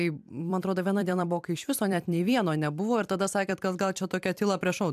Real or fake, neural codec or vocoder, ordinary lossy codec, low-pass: fake; vocoder, 44.1 kHz, 128 mel bands every 256 samples, BigVGAN v2; AAC, 96 kbps; 14.4 kHz